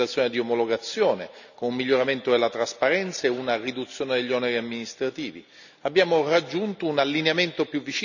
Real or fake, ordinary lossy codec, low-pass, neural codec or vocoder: real; none; 7.2 kHz; none